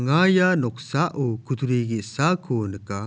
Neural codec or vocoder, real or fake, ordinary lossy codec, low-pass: none; real; none; none